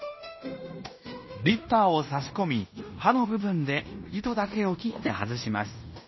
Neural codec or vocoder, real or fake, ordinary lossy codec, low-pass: codec, 16 kHz in and 24 kHz out, 0.9 kbps, LongCat-Audio-Codec, fine tuned four codebook decoder; fake; MP3, 24 kbps; 7.2 kHz